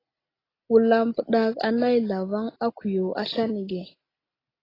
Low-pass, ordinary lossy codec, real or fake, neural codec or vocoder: 5.4 kHz; AAC, 24 kbps; real; none